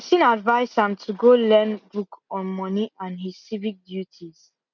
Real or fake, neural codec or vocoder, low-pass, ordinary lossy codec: real; none; 7.2 kHz; none